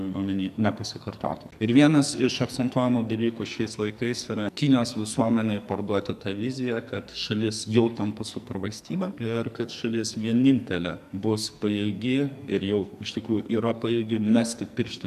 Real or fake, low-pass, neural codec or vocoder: fake; 14.4 kHz; codec, 44.1 kHz, 2.6 kbps, SNAC